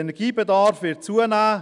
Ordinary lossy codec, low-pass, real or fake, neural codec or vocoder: none; 10.8 kHz; real; none